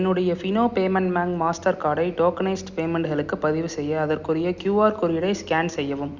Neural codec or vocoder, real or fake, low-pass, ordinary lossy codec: none; real; 7.2 kHz; Opus, 64 kbps